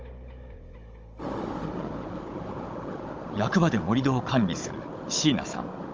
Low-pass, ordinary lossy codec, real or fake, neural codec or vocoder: 7.2 kHz; Opus, 24 kbps; fake; codec, 16 kHz, 16 kbps, FunCodec, trained on Chinese and English, 50 frames a second